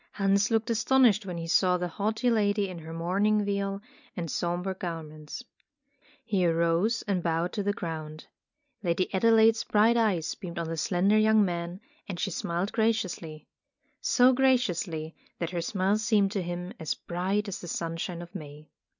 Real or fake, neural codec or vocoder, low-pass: real; none; 7.2 kHz